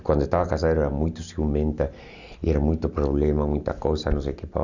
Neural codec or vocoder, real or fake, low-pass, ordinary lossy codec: none; real; 7.2 kHz; none